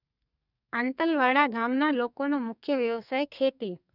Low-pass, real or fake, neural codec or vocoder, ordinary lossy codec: 5.4 kHz; fake; codec, 32 kHz, 1.9 kbps, SNAC; none